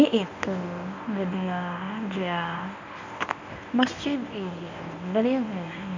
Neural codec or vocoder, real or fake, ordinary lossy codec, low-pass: codec, 24 kHz, 0.9 kbps, WavTokenizer, medium speech release version 1; fake; none; 7.2 kHz